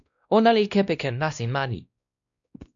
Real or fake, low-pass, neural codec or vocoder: fake; 7.2 kHz; codec, 16 kHz, 1 kbps, X-Codec, WavLM features, trained on Multilingual LibriSpeech